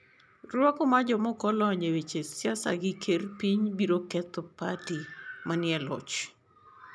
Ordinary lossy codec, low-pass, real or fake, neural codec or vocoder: none; none; real; none